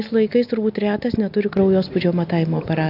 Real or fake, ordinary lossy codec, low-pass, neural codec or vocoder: real; AAC, 48 kbps; 5.4 kHz; none